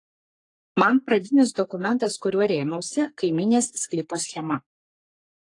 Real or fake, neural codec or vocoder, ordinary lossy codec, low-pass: fake; codec, 44.1 kHz, 3.4 kbps, Pupu-Codec; AAC, 48 kbps; 10.8 kHz